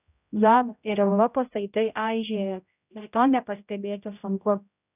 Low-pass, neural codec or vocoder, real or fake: 3.6 kHz; codec, 16 kHz, 0.5 kbps, X-Codec, HuBERT features, trained on general audio; fake